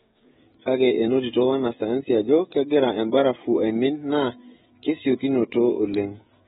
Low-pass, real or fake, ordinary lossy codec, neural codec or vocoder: 7.2 kHz; fake; AAC, 16 kbps; codec, 16 kHz, 16 kbps, FreqCodec, smaller model